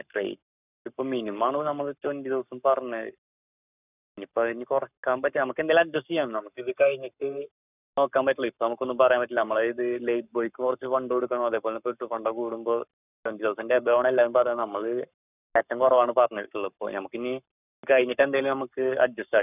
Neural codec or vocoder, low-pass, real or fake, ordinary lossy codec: codec, 44.1 kHz, 7.8 kbps, Pupu-Codec; 3.6 kHz; fake; none